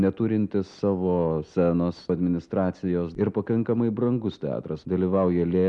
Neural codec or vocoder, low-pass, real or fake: none; 10.8 kHz; real